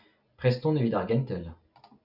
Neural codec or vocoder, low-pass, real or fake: none; 5.4 kHz; real